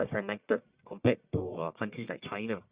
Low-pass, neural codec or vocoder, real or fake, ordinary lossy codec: 3.6 kHz; codec, 44.1 kHz, 1.7 kbps, Pupu-Codec; fake; Opus, 64 kbps